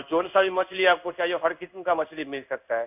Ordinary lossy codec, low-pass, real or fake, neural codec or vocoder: none; 3.6 kHz; fake; codec, 16 kHz in and 24 kHz out, 1 kbps, XY-Tokenizer